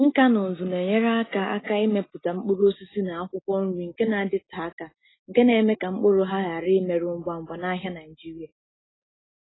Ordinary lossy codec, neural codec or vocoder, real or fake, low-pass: AAC, 16 kbps; none; real; 7.2 kHz